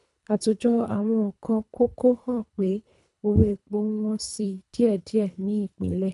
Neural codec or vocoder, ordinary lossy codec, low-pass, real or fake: codec, 24 kHz, 3 kbps, HILCodec; none; 10.8 kHz; fake